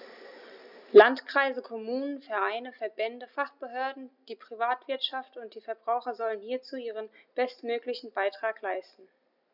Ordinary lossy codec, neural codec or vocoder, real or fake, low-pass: none; none; real; 5.4 kHz